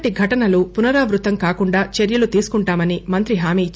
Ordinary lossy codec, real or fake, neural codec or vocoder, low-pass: none; real; none; none